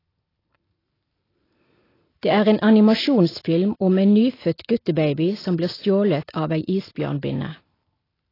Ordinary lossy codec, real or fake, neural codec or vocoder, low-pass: AAC, 24 kbps; real; none; 5.4 kHz